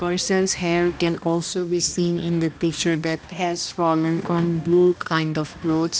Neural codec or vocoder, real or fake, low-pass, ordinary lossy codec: codec, 16 kHz, 1 kbps, X-Codec, HuBERT features, trained on balanced general audio; fake; none; none